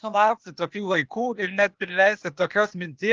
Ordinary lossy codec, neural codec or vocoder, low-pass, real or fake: Opus, 24 kbps; codec, 16 kHz, 0.8 kbps, ZipCodec; 7.2 kHz; fake